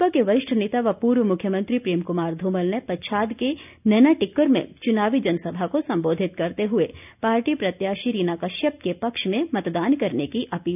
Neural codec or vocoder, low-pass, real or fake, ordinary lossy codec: none; 3.6 kHz; real; none